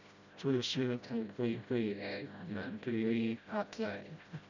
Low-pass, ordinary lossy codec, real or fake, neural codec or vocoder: 7.2 kHz; none; fake; codec, 16 kHz, 0.5 kbps, FreqCodec, smaller model